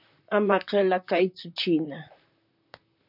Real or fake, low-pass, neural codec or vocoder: fake; 5.4 kHz; vocoder, 44.1 kHz, 128 mel bands, Pupu-Vocoder